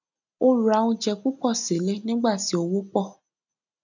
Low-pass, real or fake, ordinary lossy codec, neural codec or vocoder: 7.2 kHz; real; none; none